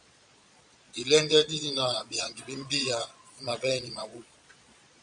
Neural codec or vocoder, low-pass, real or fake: vocoder, 22.05 kHz, 80 mel bands, Vocos; 9.9 kHz; fake